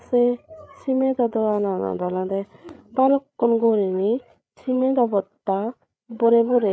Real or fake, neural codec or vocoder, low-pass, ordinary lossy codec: fake; codec, 16 kHz, 8 kbps, FreqCodec, larger model; none; none